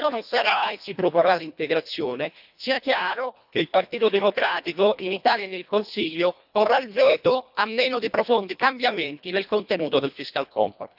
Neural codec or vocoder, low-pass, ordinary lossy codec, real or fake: codec, 24 kHz, 1.5 kbps, HILCodec; 5.4 kHz; none; fake